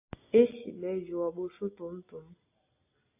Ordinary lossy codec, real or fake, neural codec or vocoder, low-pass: AAC, 32 kbps; real; none; 3.6 kHz